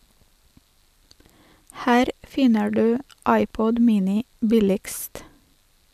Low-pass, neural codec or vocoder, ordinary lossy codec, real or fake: 14.4 kHz; none; none; real